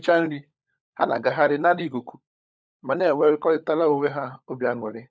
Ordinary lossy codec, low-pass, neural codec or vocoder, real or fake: none; none; codec, 16 kHz, 16 kbps, FunCodec, trained on LibriTTS, 50 frames a second; fake